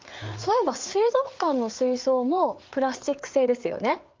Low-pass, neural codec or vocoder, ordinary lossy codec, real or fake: 7.2 kHz; codec, 16 kHz, 4 kbps, FreqCodec, larger model; Opus, 32 kbps; fake